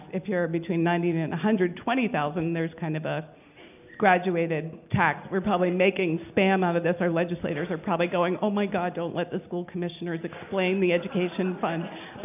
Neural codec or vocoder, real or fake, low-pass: none; real; 3.6 kHz